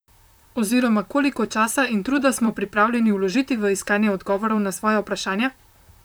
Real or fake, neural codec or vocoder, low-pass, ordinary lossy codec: fake; vocoder, 44.1 kHz, 128 mel bands, Pupu-Vocoder; none; none